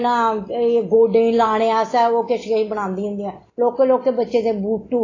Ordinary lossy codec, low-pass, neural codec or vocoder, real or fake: AAC, 32 kbps; 7.2 kHz; none; real